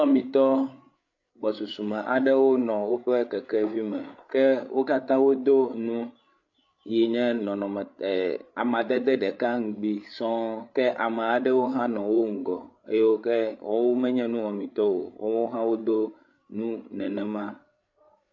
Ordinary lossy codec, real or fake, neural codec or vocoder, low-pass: MP3, 48 kbps; fake; codec, 16 kHz, 8 kbps, FreqCodec, larger model; 7.2 kHz